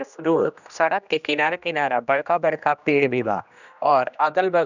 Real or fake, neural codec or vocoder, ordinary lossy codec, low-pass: fake; codec, 16 kHz, 1 kbps, X-Codec, HuBERT features, trained on general audio; none; 7.2 kHz